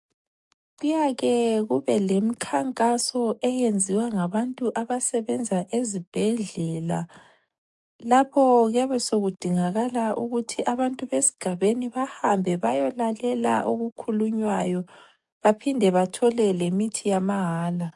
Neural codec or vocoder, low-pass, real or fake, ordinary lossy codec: codec, 44.1 kHz, 7.8 kbps, DAC; 10.8 kHz; fake; MP3, 64 kbps